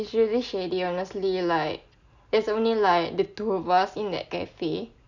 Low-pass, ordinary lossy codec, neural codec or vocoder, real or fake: 7.2 kHz; none; none; real